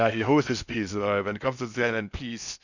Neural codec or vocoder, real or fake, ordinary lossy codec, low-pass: codec, 16 kHz in and 24 kHz out, 0.6 kbps, FocalCodec, streaming, 2048 codes; fake; none; 7.2 kHz